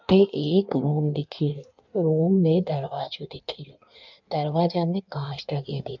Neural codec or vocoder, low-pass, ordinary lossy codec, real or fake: codec, 16 kHz in and 24 kHz out, 1.1 kbps, FireRedTTS-2 codec; 7.2 kHz; none; fake